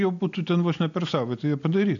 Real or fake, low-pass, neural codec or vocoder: real; 7.2 kHz; none